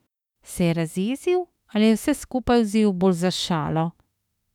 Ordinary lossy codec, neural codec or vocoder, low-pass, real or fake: none; autoencoder, 48 kHz, 32 numbers a frame, DAC-VAE, trained on Japanese speech; 19.8 kHz; fake